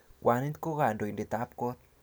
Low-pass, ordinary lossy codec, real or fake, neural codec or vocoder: none; none; real; none